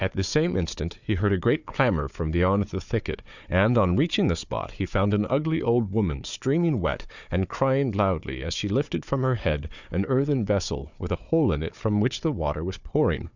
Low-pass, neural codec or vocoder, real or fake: 7.2 kHz; codec, 16 kHz, 4 kbps, FunCodec, trained on Chinese and English, 50 frames a second; fake